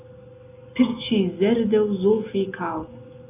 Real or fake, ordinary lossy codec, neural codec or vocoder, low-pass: real; AAC, 24 kbps; none; 3.6 kHz